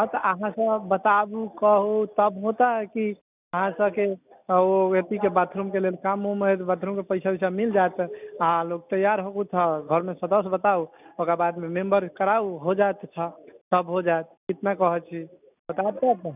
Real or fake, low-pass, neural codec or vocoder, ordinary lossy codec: real; 3.6 kHz; none; none